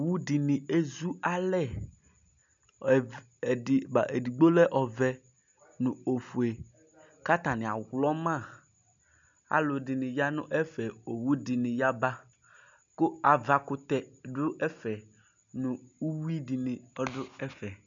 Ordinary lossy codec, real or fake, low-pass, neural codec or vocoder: MP3, 96 kbps; real; 7.2 kHz; none